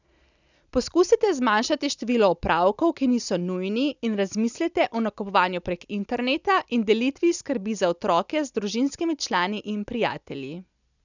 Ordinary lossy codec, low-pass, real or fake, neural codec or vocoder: none; 7.2 kHz; real; none